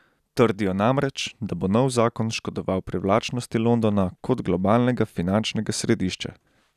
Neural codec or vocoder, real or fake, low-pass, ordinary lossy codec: none; real; 14.4 kHz; none